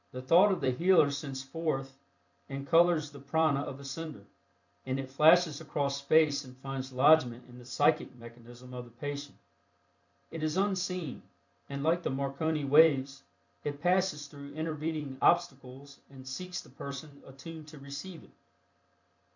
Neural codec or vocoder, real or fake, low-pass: vocoder, 44.1 kHz, 128 mel bands every 256 samples, BigVGAN v2; fake; 7.2 kHz